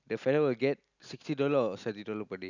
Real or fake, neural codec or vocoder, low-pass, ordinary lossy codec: real; none; 7.2 kHz; none